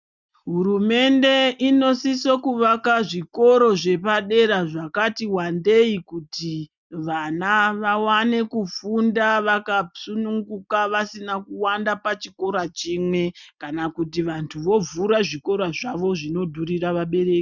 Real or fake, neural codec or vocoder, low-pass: real; none; 7.2 kHz